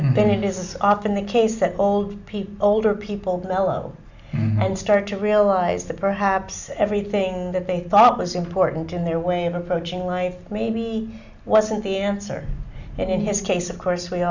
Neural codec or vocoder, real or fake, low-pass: none; real; 7.2 kHz